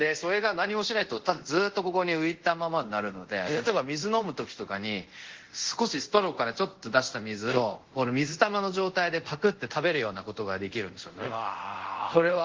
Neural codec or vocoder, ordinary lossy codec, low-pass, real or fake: codec, 24 kHz, 0.5 kbps, DualCodec; Opus, 16 kbps; 7.2 kHz; fake